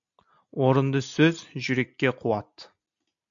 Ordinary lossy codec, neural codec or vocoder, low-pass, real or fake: AAC, 64 kbps; none; 7.2 kHz; real